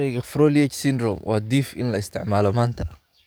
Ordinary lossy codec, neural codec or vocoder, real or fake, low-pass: none; codec, 44.1 kHz, 7.8 kbps, DAC; fake; none